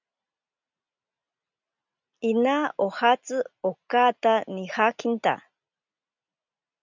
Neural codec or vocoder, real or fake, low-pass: none; real; 7.2 kHz